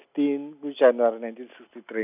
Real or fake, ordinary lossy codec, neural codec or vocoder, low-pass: real; none; none; 3.6 kHz